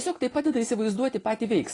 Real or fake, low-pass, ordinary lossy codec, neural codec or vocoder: real; 10.8 kHz; AAC, 32 kbps; none